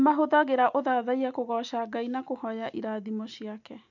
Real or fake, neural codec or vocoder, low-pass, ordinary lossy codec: real; none; 7.2 kHz; none